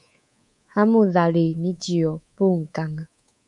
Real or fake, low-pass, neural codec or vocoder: fake; 10.8 kHz; codec, 24 kHz, 3.1 kbps, DualCodec